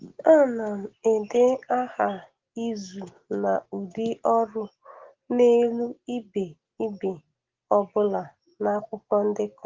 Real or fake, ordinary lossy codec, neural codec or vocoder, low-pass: real; Opus, 16 kbps; none; 7.2 kHz